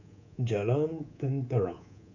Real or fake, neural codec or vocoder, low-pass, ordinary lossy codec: fake; codec, 24 kHz, 3.1 kbps, DualCodec; 7.2 kHz; none